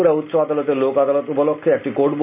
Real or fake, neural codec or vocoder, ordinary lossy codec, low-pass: real; none; none; 3.6 kHz